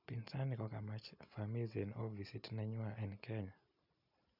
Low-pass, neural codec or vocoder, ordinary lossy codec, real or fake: 5.4 kHz; none; none; real